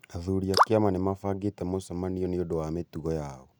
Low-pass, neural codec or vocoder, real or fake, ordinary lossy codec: none; none; real; none